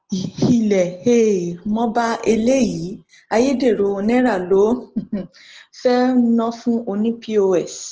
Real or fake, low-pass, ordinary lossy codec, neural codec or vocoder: real; 7.2 kHz; Opus, 16 kbps; none